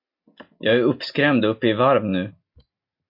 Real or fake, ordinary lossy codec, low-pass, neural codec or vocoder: real; MP3, 32 kbps; 5.4 kHz; none